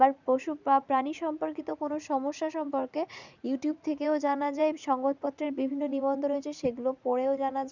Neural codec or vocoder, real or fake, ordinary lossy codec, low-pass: vocoder, 44.1 kHz, 80 mel bands, Vocos; fake; none; 7.2 kHz